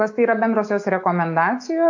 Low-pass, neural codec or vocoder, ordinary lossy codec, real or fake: 7.2 kHz; autoencoder, 48 kHz, 128 numbers a frame, DAC-VAE, trained on Japanese speech; AAC, 48 kbps; fake